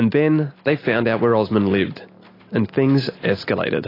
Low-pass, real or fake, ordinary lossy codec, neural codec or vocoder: 5.4 kHz; real; AAC, 24 kbps; none